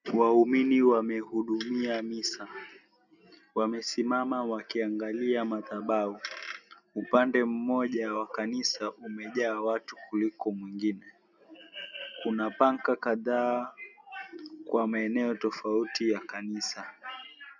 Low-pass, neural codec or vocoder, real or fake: 7.2 kHz; none; real